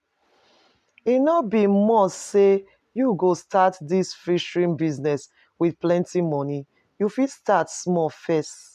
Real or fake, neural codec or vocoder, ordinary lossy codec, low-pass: real; none; none; 14.4 kHz